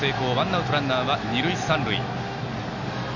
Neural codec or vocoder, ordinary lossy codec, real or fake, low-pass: none; none; real; 7.2 kHz